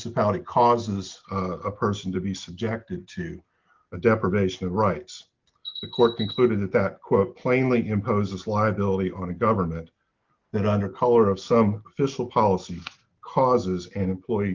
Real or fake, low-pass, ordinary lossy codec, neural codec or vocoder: real; 7.2 kHz; Opus, 24 kbps; none